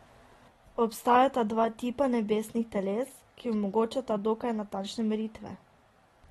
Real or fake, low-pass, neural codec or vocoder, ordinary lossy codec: real; 19.8 kHz; none; AAC, 32 kbps